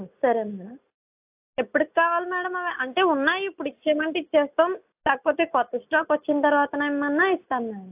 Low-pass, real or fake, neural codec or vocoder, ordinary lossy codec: 3.6 kHz; real; none; AAC, 32 kbps